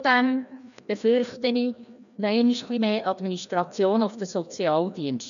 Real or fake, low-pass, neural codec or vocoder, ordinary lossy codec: fake; 7.2 kHz; codec, 16 kHz, 1 kbps, FreqCodec, larger model; none